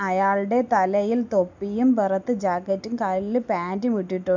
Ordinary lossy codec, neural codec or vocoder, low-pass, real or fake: none; none; 7.2 kHz; real